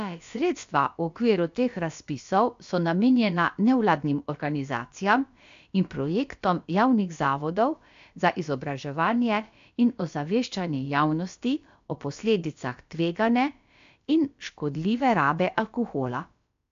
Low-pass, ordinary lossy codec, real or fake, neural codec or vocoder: 7.2 kHz; AAC, 64 kbps; fake; codec, 16 kHz, about 1 kbps, DyCAST, with the encoder's durations